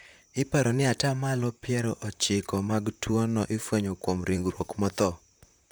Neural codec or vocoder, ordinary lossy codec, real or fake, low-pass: vocoder, 44.1 kHz, 128 mel bands, Pupu-Vocoder; none; fake; none